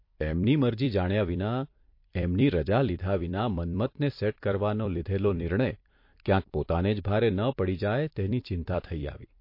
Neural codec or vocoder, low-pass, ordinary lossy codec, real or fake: vocoder, 44.1 kHz, 128 mel bands every 256 samples, BigVGAN v2; 5.4 kHz; MP3, 32 kbps; fake